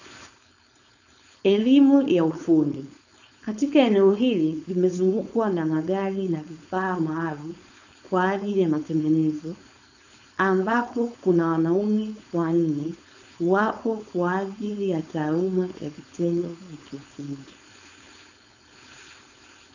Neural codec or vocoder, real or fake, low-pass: codec, 16 kHz, 4.8 kbps, FACodec; fake; 7.2 kHz